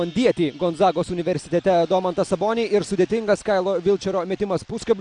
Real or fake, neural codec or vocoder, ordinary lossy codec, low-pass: real; none; MP3, 96 kbps; 9.9 kHz